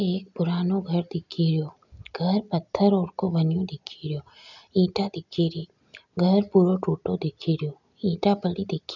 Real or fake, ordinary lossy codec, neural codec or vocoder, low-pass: real; none; none; 7.2 kHz